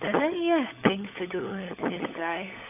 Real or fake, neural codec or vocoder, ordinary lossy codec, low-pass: fake; codec, 16 kHz, 16 kbps, FunCodec, trained on LibriTTS, 50 frames a second; AAC, 32 kbps; 3.6 kHz